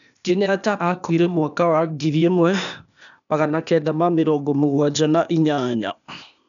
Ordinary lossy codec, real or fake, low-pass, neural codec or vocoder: none; fake; 7.2 kHz; codec, 16 kHz, 0.8 kbps, ZipCodec